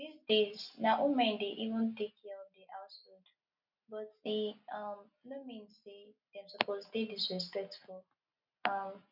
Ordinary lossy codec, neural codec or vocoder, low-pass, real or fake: none; none; 5.4 kHz; real